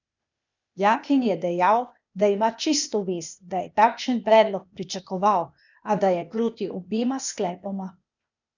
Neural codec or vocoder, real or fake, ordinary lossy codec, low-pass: codec, 16 kHz, 0.8 kbps, ZipCodec; fake; none; 7.2 kHz